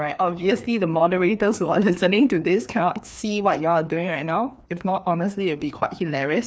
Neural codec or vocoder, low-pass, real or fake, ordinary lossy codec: codec, 16 kHz, 2 kbps, FreqCodec, larger model; none; fake; none